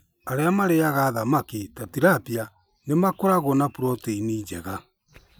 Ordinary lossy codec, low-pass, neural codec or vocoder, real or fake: none; none; none; real